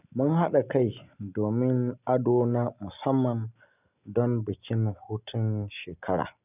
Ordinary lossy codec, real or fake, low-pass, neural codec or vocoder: none; real; 3.6 kHz; none